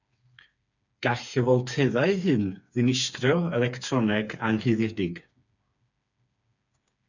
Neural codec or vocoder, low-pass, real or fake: codec, 16 kHz, 4 kbps, FreqCodec, smaller model; 7.2 kHz; fake